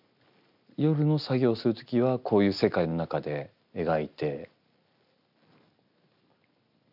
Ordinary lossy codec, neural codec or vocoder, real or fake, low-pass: none; none; real; 5.4 kHz